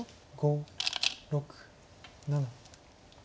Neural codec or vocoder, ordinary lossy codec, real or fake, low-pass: none; none; real; none